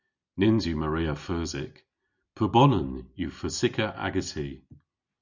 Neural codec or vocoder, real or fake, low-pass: none; real; 7.2 kHz